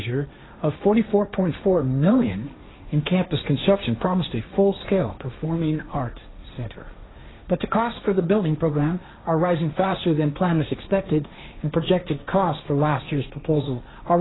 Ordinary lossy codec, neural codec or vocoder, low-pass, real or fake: AAC, 16 kbps; codec, 16 kHz, 1.1 kbps, Voila-Tokenizer; 7.2 kHz; fake